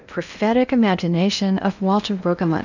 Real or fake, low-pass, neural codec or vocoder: fake; 7.2 kHz; codec, 16 kHz in and 24 kHz out, 0.8 kbps, FocalCodec, streaming, 65536 codes